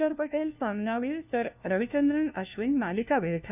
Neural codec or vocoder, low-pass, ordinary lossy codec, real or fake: codec, 16 kHz, 1 kbps, FunCodec, trained on LibriTTS, 50 frames a second; 3.6 kHz; none; fake